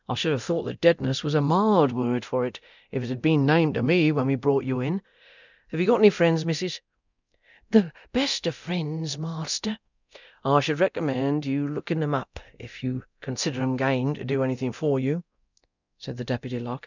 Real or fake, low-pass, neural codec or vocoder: fake; 7.2 kHz; codec, 24 kHz, 0.9 kbps, DualCodec